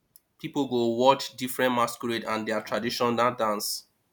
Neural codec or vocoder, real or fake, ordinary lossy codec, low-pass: none; real; none; none